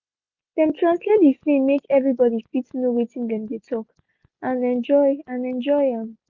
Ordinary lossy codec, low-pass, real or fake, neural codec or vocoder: Opus, 64 kbps; 7.2 kHz; real; none